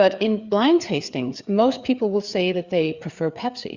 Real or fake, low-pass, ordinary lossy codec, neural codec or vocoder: fake; 7.2 kHz; Opus, 64 kbps; codec, 16 kHz, 4 kbps, FreqCodec, larger model